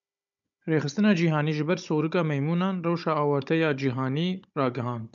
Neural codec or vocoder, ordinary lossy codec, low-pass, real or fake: codec, 16 kHz, 16 kbps, FunCodec, trained on Chinese and English, 50 frames a second; MP3, 96 kbps; 7.2 kHz; fake